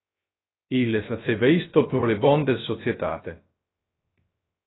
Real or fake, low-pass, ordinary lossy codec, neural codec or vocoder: fake; 7.2 kHz; AAC, 16 kbps; codec, 16 kHz, 0.3 kbps, FocalCodec